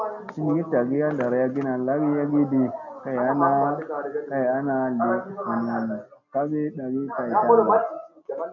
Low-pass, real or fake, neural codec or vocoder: 7.2 kHz; real; none